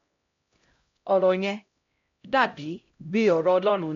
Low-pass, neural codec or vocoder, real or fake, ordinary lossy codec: 7.2 kHz; codec, 16 kHz, 0.5 kbps, X-Codec, HuBERT features, trained on LibriSpeech; fake; MP3, 48 kbps